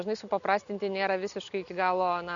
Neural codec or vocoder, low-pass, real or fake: none; 7.2 kHz; real